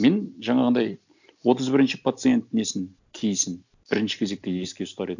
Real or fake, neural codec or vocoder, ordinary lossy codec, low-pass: real; none; none; 7.2 kHz